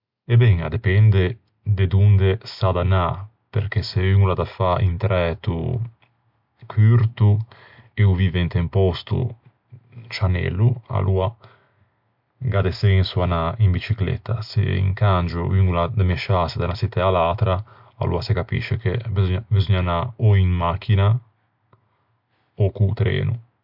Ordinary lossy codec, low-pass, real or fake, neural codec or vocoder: MP3, 48 kbps; 5.4 kHz; real; none